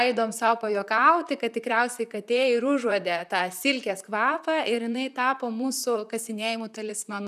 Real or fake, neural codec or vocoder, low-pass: fake; vocoder, 44.1 kHz, 128 mel bands, Pupu-Vocoder; 14.4 kHz